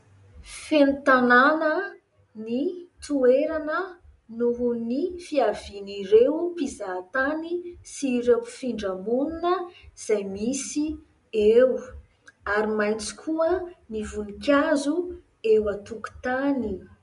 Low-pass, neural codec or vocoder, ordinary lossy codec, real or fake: 10.8 kHz; none; MP3, 64 kbps; real